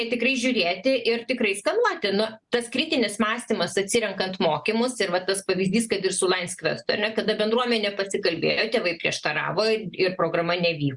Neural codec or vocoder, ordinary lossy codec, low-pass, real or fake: none; Opus, 64 kbps; 10.8 kHz; real